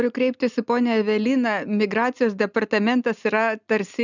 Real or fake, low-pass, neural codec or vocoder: real; 7.2 kHz; none